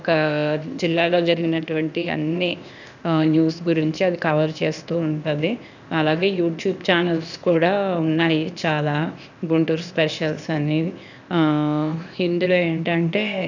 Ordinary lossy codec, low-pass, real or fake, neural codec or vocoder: none; 7.2 kHz; fake; codec, 16 kHz, 0.8 kbps, ZipCodec